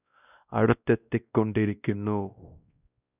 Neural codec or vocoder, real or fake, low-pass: codec, 16 kHz, 1 kbps, X-Codec, WavLM features, trained on Multilingual LibriSpeech; fake; 3.6 kHz